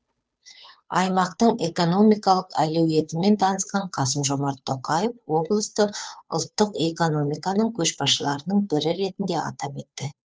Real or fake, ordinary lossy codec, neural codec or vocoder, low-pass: fake; none; codec, 16 kHz, 2 kbps, FunCodec, trained on Chinese and English, 25 frames a second; none